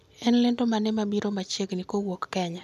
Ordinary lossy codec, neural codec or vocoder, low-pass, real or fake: none; none; 14.4 kHz; real